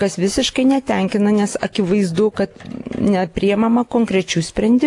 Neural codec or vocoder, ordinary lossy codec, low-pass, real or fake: none; AAC, 48 kbps; 10.8 kHz; real